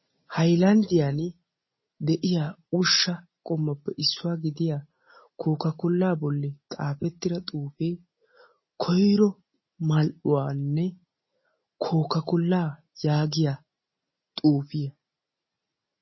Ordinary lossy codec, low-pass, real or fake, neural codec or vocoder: MP3, 24 kbps; 7.2 kHz; real; none